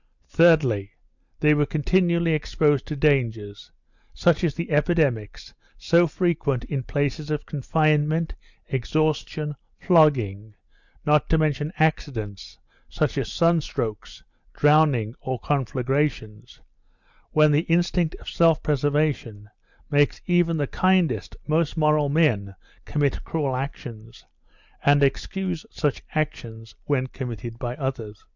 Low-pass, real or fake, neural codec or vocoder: 7.2 kHz; real; none